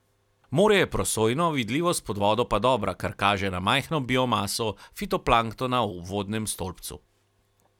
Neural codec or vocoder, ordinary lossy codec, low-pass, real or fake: none; none; 19.8 kHz; real